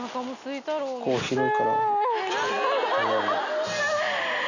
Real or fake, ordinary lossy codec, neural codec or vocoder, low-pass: real; none; none; 7.2 kHz